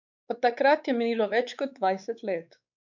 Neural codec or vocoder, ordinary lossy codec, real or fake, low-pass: codec, 16 kHz, 4 kbps, X-Codec, WavLM features, trained on Multilingual LibriSpeech; none; fake; 7.2 kHz